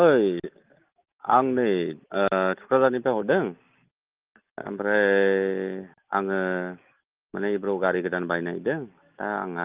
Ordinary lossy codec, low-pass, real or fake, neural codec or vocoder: Opus, 24 kbps; 3.6 kHz; real; none